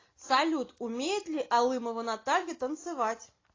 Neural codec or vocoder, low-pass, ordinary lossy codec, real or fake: none; 7.2 kHz; AAC, 32 kbps; real